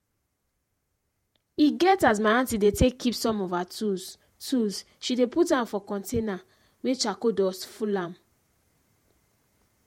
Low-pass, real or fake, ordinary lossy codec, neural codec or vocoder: 19.8 kHz; fake; MP3, 64 kbps; vocoder, 48 kHz, 128 mel bands, Vocos